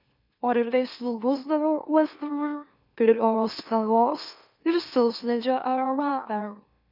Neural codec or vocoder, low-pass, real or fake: autoencoder, 44.1 kHz, a latent of 192 numbers a frame, MeloTTS; 5.4 kHz; fake